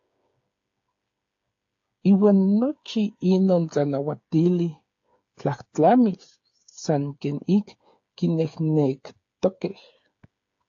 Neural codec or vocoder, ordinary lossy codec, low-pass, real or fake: codec, 16 kHz, 8 kbps, FreqCodec, smaller model; AAC, 48 kbps; 7.2 kHz; fake